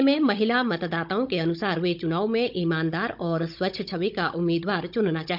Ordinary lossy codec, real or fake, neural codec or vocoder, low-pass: none; fake; codec, 16 kHz, 16 kbps, FunCodec, trained on Chinese and English, 50 frames a second; 5.4 kHz